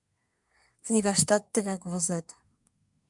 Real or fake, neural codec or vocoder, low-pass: fake; codec, 24 kHz, 1 kbps, SNAC; 10.8 kHz